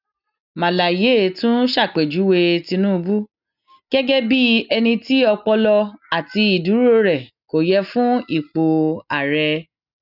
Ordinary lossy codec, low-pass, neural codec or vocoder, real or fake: none; 5.4 kHz; none; real